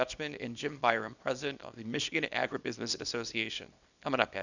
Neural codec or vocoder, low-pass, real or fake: codec, 24 kHz, 0.9 kbps, WavTokenizer, small release; 7.2 kHz; fake